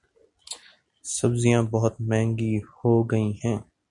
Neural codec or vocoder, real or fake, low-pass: none; real; 10.8 kHz